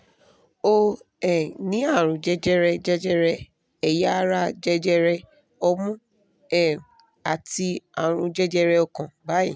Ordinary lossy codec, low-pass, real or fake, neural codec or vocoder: none; none; real; none